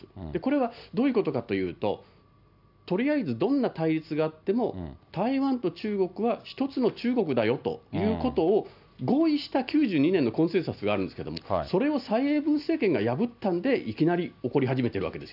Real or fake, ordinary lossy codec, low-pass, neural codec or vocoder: real; none; 5.4 kHz; none